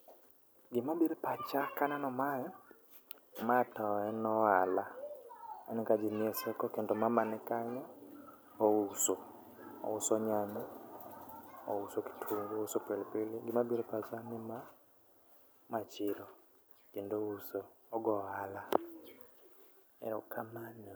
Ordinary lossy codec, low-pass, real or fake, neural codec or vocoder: none; none; real; none